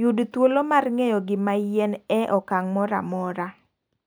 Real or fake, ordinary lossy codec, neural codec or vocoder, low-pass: real; none; none; none